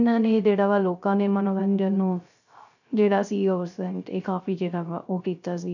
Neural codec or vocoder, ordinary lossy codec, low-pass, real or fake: codec, 16 kHz, 0.3 kbps, FocalCodec; none; 7.2 kHz; fake